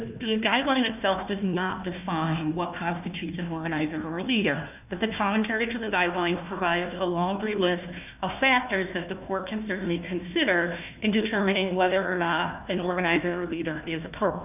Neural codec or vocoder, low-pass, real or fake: codec, 16 kHz, 1 kbps, FunCodec, trained on Chinese and English, 50 frames a second; 3.6 kHz; fake